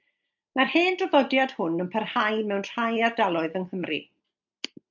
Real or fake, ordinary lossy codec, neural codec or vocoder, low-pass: real; MP3, 64 kbps; none; 7.2 kHz